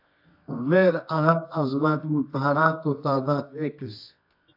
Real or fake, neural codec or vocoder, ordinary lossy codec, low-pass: fake; codec, 24 kHz, 0.9 kbps, WavTokenizer, medium music audio release; AAC, 48 kbps; 5.4 kHz